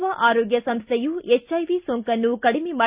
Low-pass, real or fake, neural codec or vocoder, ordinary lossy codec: 3.6 kHz; real; none; Opus, 64 kbps